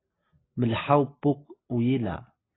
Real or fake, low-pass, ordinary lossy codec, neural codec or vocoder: real; 7.2 kHz; AAC, 16 kbps; none